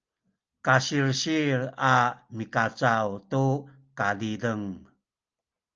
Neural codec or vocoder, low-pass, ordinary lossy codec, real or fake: none; 7.2 kHz; Opus, 24 kbps; real